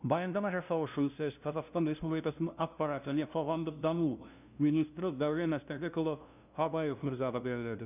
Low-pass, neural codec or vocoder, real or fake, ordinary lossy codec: 3.6 kHz; codec, 16 kHz, 0.5 kbps, FunCodec, trained on LibriTTS, 25 frames a second; fake; none